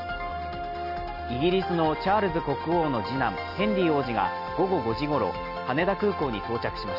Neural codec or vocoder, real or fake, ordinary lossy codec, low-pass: none; real; none; 5.4 kHz